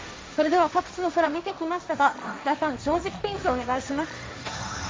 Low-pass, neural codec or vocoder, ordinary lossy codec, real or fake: none; codec, 16 kHz, 1.1 kbps, Voila-Tokenizer; none; fake